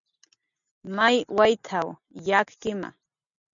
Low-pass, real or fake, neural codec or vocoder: 7.2 kHz; real; none